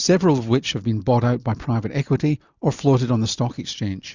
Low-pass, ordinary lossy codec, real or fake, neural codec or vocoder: 7.2 kHz; Opus, 64 kbps; real; none